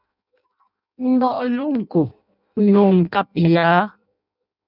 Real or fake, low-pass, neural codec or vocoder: fake; 5.4 kHz; codec, 16 kHz in and 24 kHz out, 0.6 kbps, FireRedTTS-2 codec